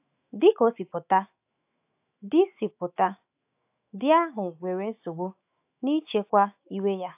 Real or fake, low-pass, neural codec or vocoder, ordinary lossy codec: fake; 3.6 kHz; autoencoder, 48 kHz, 128 numbers a frame, DAC-VAE, trained on Japanese speech; AAC, 32 kbps